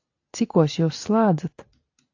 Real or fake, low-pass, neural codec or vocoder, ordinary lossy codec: real; 7.2 kHz; none; AAC, 48 kbps